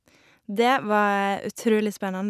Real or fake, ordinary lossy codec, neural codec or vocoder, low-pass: real; none; none; 14.4 kHz